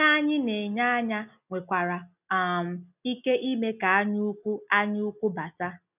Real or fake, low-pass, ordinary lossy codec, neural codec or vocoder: real; 3.6 kHz; none; none